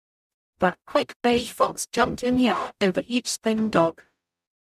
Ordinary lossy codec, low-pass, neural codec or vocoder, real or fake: none; 14.4 kHz; codec, 44.1 kHz, 0.9 kbps, DAC; fake